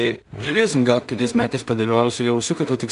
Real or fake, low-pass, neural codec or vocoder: fake; 10.8 kHz; codec, 16 kHz in and 24 kHz out, 0.4 kbps, LongCat-Audio-Codec, two codebook decoder